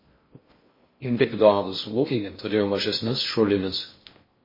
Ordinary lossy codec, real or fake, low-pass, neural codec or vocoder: MP3, 24 kbps; fake; 5.4 kHz; codec, 16 kHz in and 24 kHz out, 0.6 kbps, FocalCodec, streaming, 4096 codes